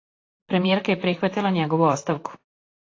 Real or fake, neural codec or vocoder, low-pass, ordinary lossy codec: fake; vocoder, 44.1 kHz, 128 mel bands, Pupu-Vocoder; 7.2 kHz; AAC, 32 kbps